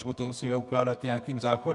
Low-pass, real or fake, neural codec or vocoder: 10.8 kHz; fake; codec, 24 kHz, 0.9 kbps, WavTokenizer, medium music audio release